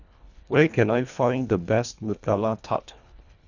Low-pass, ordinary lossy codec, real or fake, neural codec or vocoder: 7.2 kHz; none; fake; codec, 24 kHz, 1.5 kbps, HILCodec